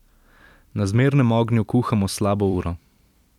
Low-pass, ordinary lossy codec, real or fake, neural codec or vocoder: 19.8 kHz; none; fake; vocoder, 44.1 kHz, 128 mel bands every 256 samples, BigVGAN v2